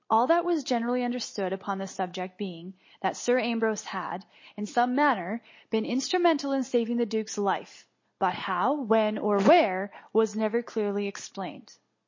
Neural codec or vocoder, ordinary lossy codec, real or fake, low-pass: none; MP3, 32 kbps; real; 7.2 kHz